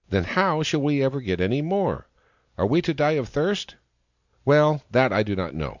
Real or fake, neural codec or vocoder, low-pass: real; none; 7.2 kHz